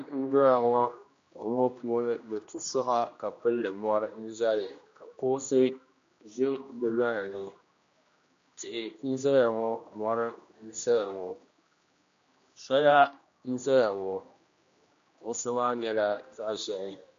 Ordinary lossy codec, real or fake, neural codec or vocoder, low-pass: MP3, 48 kbps; fake; codec, 16 kHz, 1 kbps, X-Codec, HuBERT features, trained on general audio; 7.2 kHz